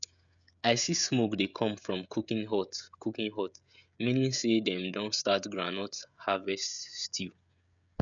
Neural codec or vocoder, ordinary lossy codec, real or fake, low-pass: codec, 16 kHz, 16 kbps, FreqCodec, smaller model; none; fake; 7.2 kHz